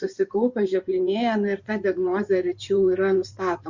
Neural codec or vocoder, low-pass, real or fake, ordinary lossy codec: codec, 16 kHz in and 24 kHz out, 2.2 kbps, FireRedTTS-2 codec; 7.2 kHz; fake; Opus, 64 kbps